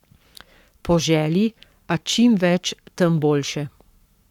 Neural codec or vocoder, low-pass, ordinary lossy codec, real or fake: codec, 44.1 kHz, 7.8 kbps, Pupu-Codec; 19.8 kHz; none; fake